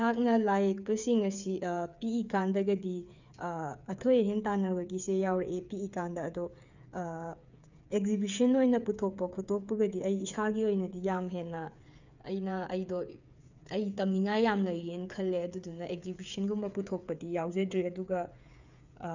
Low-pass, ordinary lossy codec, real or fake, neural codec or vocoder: 7.2 kHz; none; fake; codec, 16 kHz, 8 kbps, FreqCodec, smaller model